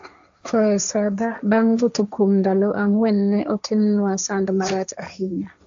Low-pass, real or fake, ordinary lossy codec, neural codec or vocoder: 7.2 kHz; fake; AAC, 64 kbps; codec, 16 kHz, 1.1 kbps, Voila-Tokenizer